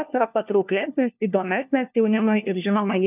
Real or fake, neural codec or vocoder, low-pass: fake; codec, 16 kHz, 1 kbps, FunCodec, trained on LibriTTS, 50 frames a second; 3.6 kHz